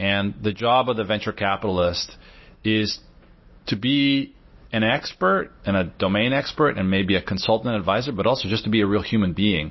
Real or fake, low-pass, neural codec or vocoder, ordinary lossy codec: real; 7.2 kHz; none; MP3, 24 kbps